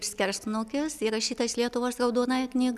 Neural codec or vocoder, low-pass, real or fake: none; 14.4 kHz; real